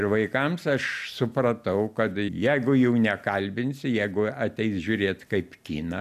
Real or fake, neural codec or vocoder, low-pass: real; none; 14.4 kHz